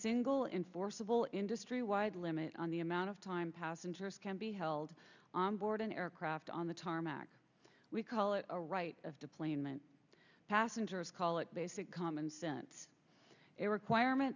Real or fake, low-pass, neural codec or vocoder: real; 7.2 kHz; none